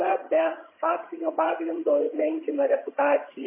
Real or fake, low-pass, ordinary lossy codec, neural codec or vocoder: fake; 3.6 kHz; MP3, 16 kbps; vocoder, 22.05 kHz, 80 mel bands, HiFi-GAN